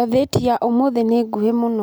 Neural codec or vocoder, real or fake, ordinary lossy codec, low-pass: none; real; none; none